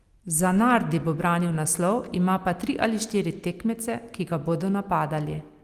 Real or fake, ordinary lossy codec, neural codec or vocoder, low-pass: real; Opus, 24 kbps; none; 14.4 kHz